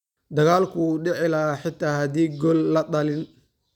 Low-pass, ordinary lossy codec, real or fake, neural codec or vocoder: 19.8 kHz; none; real; none